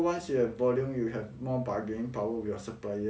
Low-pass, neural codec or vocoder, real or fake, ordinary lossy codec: none; none; real; none